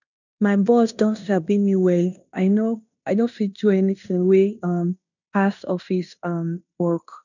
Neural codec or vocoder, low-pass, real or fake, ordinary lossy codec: codec, 16 kHz in and 24 kHz out, 0.9 kbps, LongCat-Audio-Codec, fine tuned four codebook decoder; 7.2 kHz; fake; none